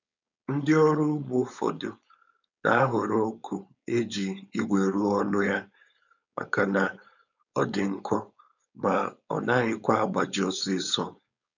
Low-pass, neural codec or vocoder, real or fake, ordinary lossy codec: 7.2 kHz; codec, 16 kHz, 4.8 kbps, FACodec; fake; none